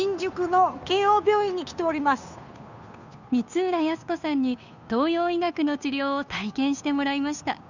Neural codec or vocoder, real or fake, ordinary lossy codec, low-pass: codec, 16 kHz, 2 kbps, FunCodec, trained on Chinese and English, 25 frames a second; fake; none; 7.2 kHz